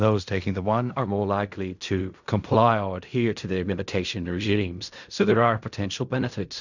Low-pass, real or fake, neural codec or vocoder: 7.2 kHz; fake; codec, 16 kHz in and 24 kHz out, 0.4 kbps, LongCat-Audio-Codec, fine tuned four codebook decoder